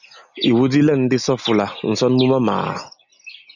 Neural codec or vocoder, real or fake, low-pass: none; real; 7.2 kHz